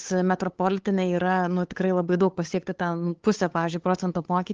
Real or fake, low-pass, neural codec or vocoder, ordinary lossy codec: fake; 7.2 kHz; codec, 16 kHz, 8 kbps, FunCodec, trained on LibriTTS, 25 frames a second; Opus, 16 kbps